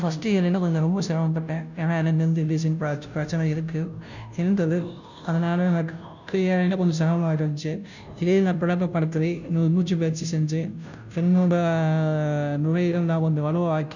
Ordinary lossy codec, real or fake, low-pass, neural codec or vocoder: none; fake; 7.2 kHz; codec, 16 kHz, 0.5 kbps, FunCodec, trained on Chinese and English, 25 frames a second